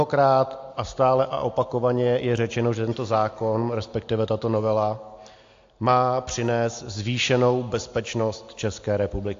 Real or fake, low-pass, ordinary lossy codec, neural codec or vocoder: real; 7.2 kHz; AAC, 48 kbps; none